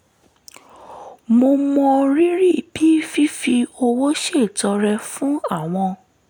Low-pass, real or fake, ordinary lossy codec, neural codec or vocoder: none; real; none; none